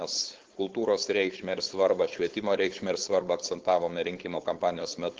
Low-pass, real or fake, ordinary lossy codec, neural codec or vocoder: 7.2 kHz; fake; Opus, 16 kbps; codec, 16 kHz, 4.8 kbps, FACodec